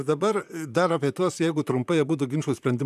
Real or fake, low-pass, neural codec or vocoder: fake; 14.4 kHz; vocoder, 44.1 kHz, 128 mel bands, Pupu-Vocoder